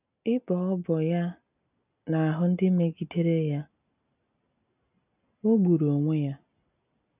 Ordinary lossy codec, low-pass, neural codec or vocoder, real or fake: none; 3.6 kHz; none; real